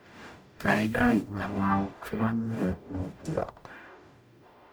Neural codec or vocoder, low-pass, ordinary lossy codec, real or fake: codec, 44.1 kHz, 0.9 kbps, DAC; none; none; fake